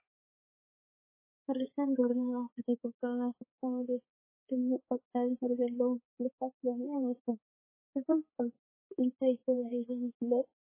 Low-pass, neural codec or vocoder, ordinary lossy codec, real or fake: 3.6 kHz; codec, 16 kHz, 4 kbps, X-Codec, HuBERT features, trained on balanced general audio; MP3, 16 kbps; fake